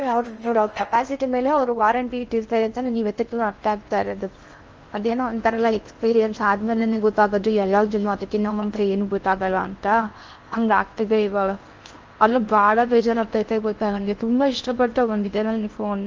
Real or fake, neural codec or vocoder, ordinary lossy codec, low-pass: fake; codec, 16 kHz in and 24 kHz out, 0.6 kbps, FocalCodec, streaming, 4096 codes; Opus, 24 kbps; 7.2 kHz